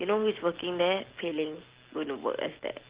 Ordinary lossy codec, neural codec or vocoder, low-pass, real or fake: Opus, 16 kbps; none; 3.6 kHz; real